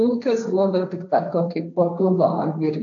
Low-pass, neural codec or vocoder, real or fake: 7.2 kHz; codec, 16 kHz, 1.1 kbps, Voila-Tokenizer; fake